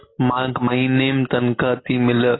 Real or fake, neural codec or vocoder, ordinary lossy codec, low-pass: real; none; AAC, 16 kbps; 7.2 kHz